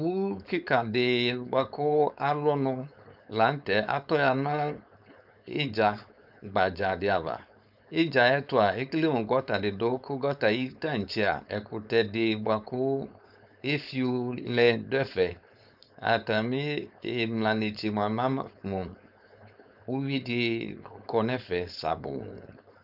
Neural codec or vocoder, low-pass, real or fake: codec, 16 kHz, 4.8 kbps, FACodec; 5.4 kHz; fake